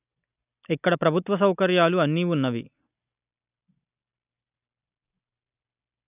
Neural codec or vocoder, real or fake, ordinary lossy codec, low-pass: none; real; none; 3.6 kHz